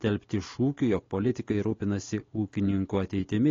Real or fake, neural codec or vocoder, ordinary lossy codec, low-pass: real; none; AAC, 32 kbps; 7.2 kHz